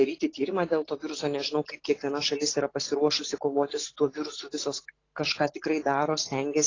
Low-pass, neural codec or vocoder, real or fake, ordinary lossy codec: 7.2 kHz; none; real; AAC, 32 kbps